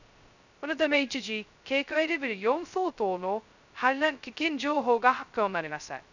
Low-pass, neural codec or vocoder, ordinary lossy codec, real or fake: 7.2 kHz; codec, 16 kHz, 0.2 kbps, FocalCodec; none; fake